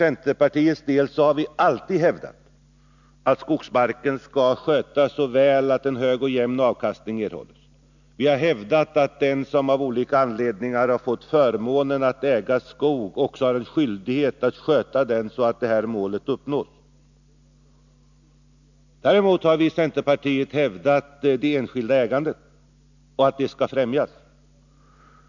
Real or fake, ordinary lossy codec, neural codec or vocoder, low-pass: real; none; none; 7.2 kHz